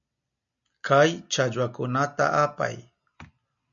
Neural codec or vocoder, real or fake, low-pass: none; real; 7.2 kHz